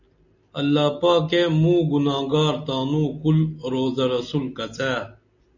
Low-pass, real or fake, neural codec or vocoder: 7.2 kHz; real; none